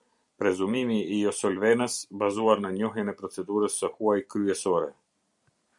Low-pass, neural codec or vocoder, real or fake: 10.8 kHz; vocoder, 44.1 kHz, 128 mel bands every 256 samples, BigVGAN v2; fake